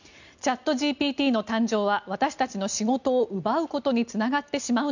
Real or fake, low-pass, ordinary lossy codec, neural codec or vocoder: real; 7.2 kHz; none; none